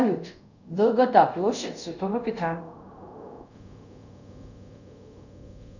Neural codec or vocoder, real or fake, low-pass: codec, 24 kHz, 0.5 kbps, DualCodec; fake; 7.2 kHz